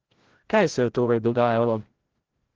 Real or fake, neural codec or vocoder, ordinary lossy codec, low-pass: fake; codec, 16 kHz, 0.5 kbps, FreqCodec, larger model; Opus, 16 kbps; 7.2 kHz